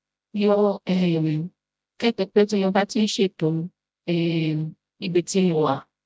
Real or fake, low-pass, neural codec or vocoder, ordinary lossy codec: fake; none; codec, 16 kHz, 0.5 kbps, FreqCodec, smaller model; none